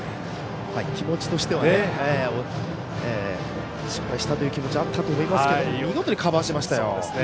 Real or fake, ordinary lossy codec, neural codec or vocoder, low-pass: real; none; none; none